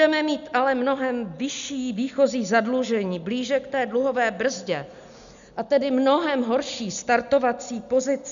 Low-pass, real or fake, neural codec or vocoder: 7.2 kHz; real; none